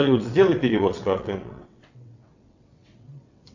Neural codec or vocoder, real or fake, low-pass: vocoder, 22.05 kHz, 80 mel bands, WaveNeXt; fake; 7.2 kHz